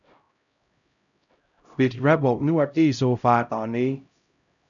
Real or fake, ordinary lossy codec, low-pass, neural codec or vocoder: fake; none; 7.2 kHz; codec, 16 kHz, 0.5 kbps, X-Codec, HuBERT features, trained on LibriSpeech